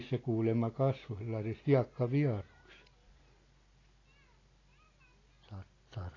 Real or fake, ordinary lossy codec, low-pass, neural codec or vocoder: real; AAC, 32 kbps; 7.2 kHz; none